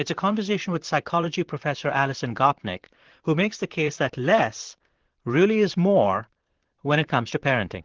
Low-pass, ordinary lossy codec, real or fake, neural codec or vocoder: 7.2 kHz; Opus, 16 kbps; fake; vocoder, 44.1 kHz, 128 mel bands, Pupu-Vocoder